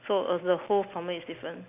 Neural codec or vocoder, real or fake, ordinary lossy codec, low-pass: none; real; none; 3.6 kHz